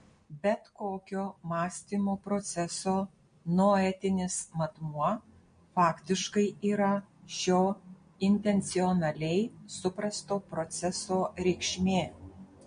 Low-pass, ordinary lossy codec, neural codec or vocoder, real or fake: 9.9 kHz; MP3, 48 kbps; none; real